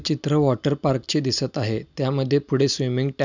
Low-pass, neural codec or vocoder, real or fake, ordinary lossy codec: 7.2 kHz; none; real; none